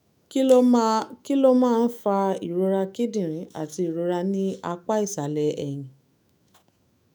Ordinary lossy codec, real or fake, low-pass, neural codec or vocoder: none; fake; none; autoencoder, 48 kHz, 128 numbers a frame, DAC-VAE, trained on Japanese speech